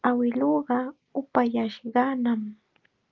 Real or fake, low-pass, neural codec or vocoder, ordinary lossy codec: real; 7.2 kHz; none; Opus, 32 kbps